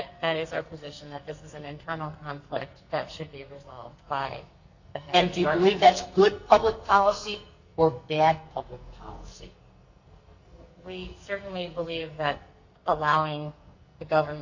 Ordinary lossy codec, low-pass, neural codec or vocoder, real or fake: Opus, 64 kbps; 7.2 kHz; codec, 44.1 kHz, 2.6 kbps, SNAC; fake